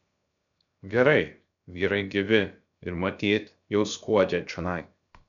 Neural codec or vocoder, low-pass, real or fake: codec, 16 kHz, 0.7 kbps, FocalCodec; 7.2 kHz; fake